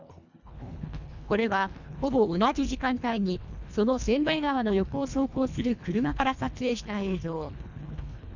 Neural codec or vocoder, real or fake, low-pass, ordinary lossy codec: codec, 24 kHz, 1.5 kbps, HILCodec; fake; 7.2 kHz; none